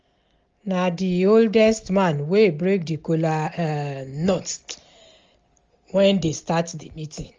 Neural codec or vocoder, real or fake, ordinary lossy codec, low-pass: none; real; Opus, 32 kbps; 7.2 kHz